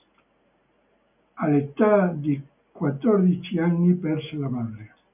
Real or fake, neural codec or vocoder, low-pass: real; none; 3.6 kHz